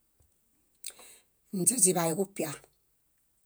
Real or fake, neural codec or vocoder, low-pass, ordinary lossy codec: fake; vocoder, 48 kHz, 128 mel bands, Vocos; none; none